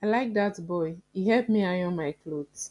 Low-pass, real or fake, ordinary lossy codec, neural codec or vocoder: 10.8 kHz; real; none; none